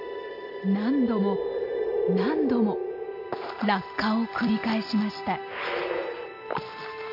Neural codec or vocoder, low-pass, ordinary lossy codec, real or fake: none; 5.4 kHz; MP3, 32 kbps; real